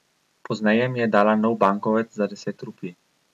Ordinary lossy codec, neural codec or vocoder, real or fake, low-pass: none; none; real; 14.4 kHz